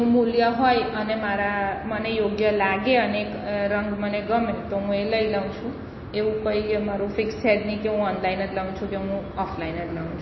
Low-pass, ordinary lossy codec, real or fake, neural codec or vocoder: 7.2 kHz; MP3, 24 kbps; real; none